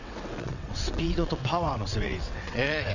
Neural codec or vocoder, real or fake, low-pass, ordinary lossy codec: vocoder, 22.05 kHz, 80 mel bands, WaveNeXt; fake; 7.2 kHz; none